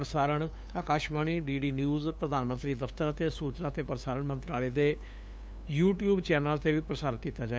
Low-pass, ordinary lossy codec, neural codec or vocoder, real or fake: none; none; codec, 16 kHz, 2 kbps, FunCodec, trained on LibriTTS, 25 frames a second; fake